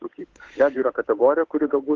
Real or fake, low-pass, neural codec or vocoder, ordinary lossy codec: fake; 7.2 kHz; codec, 16 kHz, 8 kbps, FunCodec, trained on Chinese and English, 25 frames a second; Opus, 16 kbps